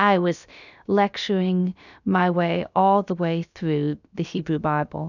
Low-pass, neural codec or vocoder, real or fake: 7.2 kHz; codec, 16 kHz, about 1 kbps, DyCAST, with the encoder's durations; fake